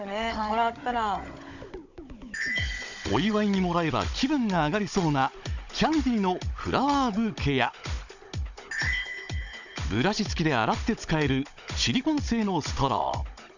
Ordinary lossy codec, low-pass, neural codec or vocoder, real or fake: none; 7.2 kHz; codec, 16 kHz, 16 kbps, FunCodec, trained on Chinese and English, 50 frames a second; fake